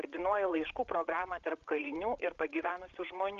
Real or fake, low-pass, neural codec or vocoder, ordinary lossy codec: fake; 7.2 kHz; codec, 16 kHz, 8 kbps, FreqCodec, larger model; Opus, 24 kbps